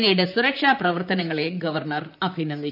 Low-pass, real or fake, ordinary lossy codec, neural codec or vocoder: 5.4 kHz; fake; none; vocoder, 44.1 kHz, 128 mel bands, Pupu-Vocoder